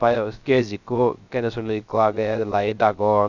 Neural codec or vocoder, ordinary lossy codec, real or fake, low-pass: codec, 16 kHz, 0.3 kbps, FocalCodec; none; fake; 7.2 kHz